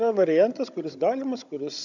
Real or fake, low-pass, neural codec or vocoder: fake; 7.2 kHz; codec, 16 kHz, 16 kbps, FunCodec, trained on Chinese and English, 50 frames a second